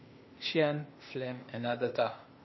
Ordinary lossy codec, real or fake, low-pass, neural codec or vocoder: MP3, 24 kbps; fake; 7.2 kHz; codec, 16 kHz, 0.8 kbps, ZipCodec